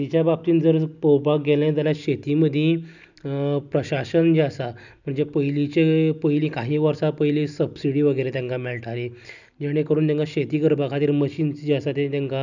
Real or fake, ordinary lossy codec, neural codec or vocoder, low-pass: real; none; none; 7.2 kHz